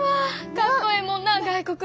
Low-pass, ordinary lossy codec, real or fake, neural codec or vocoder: none; none; real; none